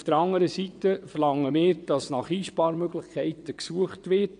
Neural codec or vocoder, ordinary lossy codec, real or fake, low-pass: vocoder, 22.05 kHz, 80 mel bands, WaveNeXt; none; fake; 9.9 kHz